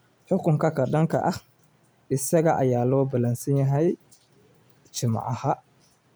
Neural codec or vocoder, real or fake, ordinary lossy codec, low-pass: none; real; none; none